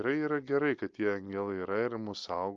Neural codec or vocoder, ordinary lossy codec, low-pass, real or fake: none; Opus, 24 kbps; 7.2 kHz; real